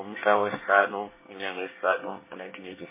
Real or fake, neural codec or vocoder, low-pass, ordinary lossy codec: fake; codec, 24 kHz, 1 kbps, SNAC; 3.6 kHz; MP3, 16 kbps